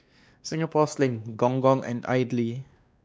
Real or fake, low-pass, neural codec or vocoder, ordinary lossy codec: fake; none; codec, 16 kHz, 2 kbps, X-Codec, WavLM features, trained on Multilingual LibriSpeech; none